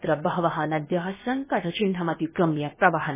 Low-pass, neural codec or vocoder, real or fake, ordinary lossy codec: 3.6 kHz; codec, 16 kHz, about 1 kbps, DyCAST, with the encoder's durations; fake; MP3, 16 kbps